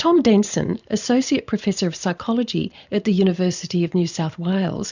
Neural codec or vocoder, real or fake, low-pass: vocoder, 44.1 kHz, 128 mel bands every 512 samples, BigVGAN v2; fake; 7.2 kHz